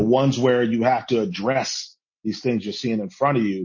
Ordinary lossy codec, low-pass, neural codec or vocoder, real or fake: MP3, 32 kbps; 7.2 kHz; none; real